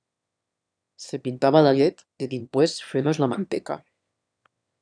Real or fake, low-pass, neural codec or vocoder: fake; 9.9 kHz; autoencoder, 22.05 kHz, a latent of 192 numbers a frame, VITS, trained on one speaker